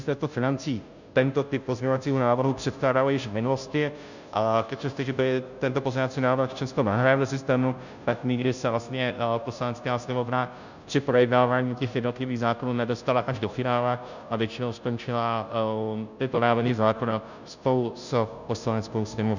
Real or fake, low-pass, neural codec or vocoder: fake; 7.2 kHz; codec, 16 kHz, 0.5 kbps, FunCodec, trained on Chinese and English, 25 frames a second